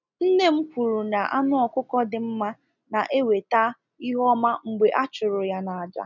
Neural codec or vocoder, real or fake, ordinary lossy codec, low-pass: none; real; none; 7.2 kHz